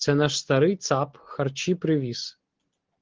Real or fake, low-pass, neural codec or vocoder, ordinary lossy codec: real; 7.2 kHz; none; Opus, 16 kbps